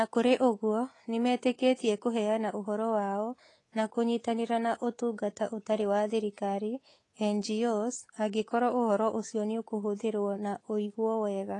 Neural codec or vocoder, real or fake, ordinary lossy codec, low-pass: codec, 24 kHz, 3.1 kbps, DualCodec; fake; AAC, 32 kbps; 10.8 kHz